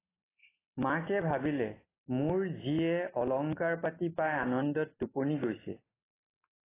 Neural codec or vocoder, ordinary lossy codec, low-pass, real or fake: none; AAC, 16 kbps; 3.6 kHz; real